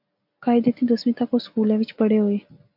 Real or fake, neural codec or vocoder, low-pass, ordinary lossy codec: real; none; 5.4 kHz; AAC, 48 kbps